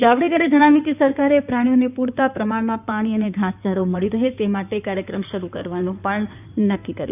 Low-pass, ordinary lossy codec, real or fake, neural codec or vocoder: 3.6 kHz; none; fake; codec, 16 kHz in and 24 kHz out, 2.2 kbps, FireRedTTS-2 codec